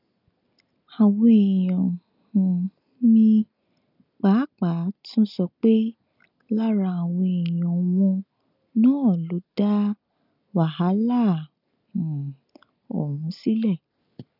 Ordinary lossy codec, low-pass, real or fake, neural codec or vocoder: none; 5.4 kHz; real; none